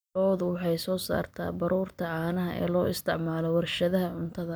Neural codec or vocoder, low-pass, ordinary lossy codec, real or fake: none; none; none; real